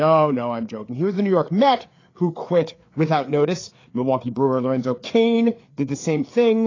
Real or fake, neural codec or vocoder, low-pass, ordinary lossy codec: fake; codec, 16 kHz, 4 kbps, FreqCodec, larger model; 7.2 kHz; AAC, 32 kbps